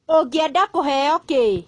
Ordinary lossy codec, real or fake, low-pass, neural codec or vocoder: AAC, 32 kbps; real; 10.8 kHz; none